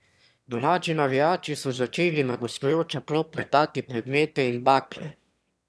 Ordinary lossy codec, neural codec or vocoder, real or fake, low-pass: none; autoencoder, 22.05 kHz, a latent of 192 numbers a frame, VITS, trained on one speaker; fake; none